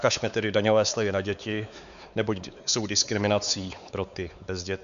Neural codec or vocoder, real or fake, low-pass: codec, 16 kHz, 4 kbps, X-Codec, WavLM features, trained on Multilingual LibriSpeech; fake; 7.2 kHz